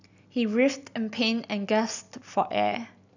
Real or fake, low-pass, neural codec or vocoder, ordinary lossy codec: real; 7.2 kHz; none; none